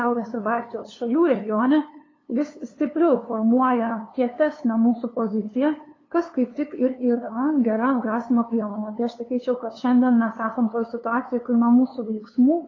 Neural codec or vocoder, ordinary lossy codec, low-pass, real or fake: codec, 16 kHz, 2 kbps, FunCodec, trained on LibriTTS, 25 frames a second; AAC, 32 kbps; 7.2 kHz; fake